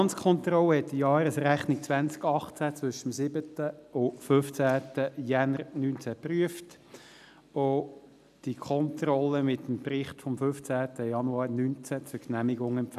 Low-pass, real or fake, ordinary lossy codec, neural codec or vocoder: 14.4 kHz; real; none; none